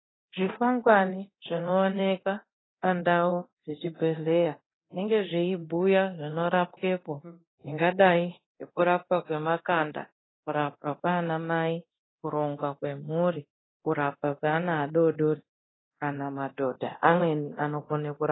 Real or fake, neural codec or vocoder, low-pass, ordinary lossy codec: fake; codec, 24 kHz, 0.9 kbps, DualCodec; 7.2 kHz; AAC, 16 kbps